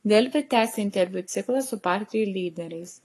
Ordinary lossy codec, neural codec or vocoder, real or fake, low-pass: AAC, 48 kbps; codec, 44.1 kHz, 7.8 kbps, Pupu-Codec; fake; 14.4 kHz